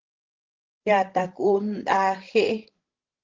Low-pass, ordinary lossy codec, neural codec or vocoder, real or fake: 7.2 kHz; Opus, 16 kbps; codec, 16 kHz, 16 kbps, FreqCodec, larger model; fake